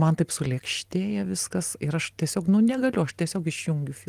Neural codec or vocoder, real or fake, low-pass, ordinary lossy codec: none; real; 14.4 kHz; Opus, 24 kbps